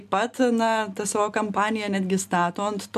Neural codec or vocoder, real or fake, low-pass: none; real; 14.4 kHz